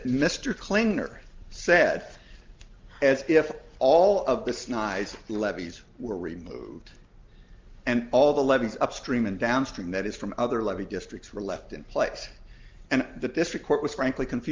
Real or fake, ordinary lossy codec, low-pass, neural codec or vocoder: real; Opus, 24 kbps; 7.2 kHz; none